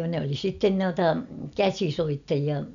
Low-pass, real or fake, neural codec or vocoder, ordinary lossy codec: 7.2 kHz; real; none; none